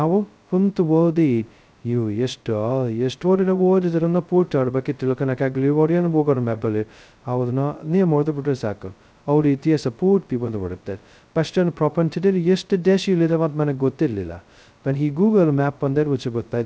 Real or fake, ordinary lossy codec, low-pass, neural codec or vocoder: fake; none; none; codec, 16 kHz, 0.2 kbps, FocalCodec